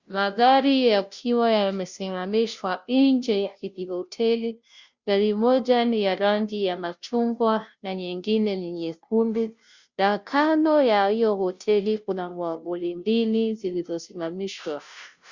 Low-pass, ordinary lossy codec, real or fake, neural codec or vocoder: 7.2 kHz; Opus, 64 kbps; fake; codec, 16 kHz, 0.5 kbps, FunCodec, trained on Chinese and English, 25 frames a second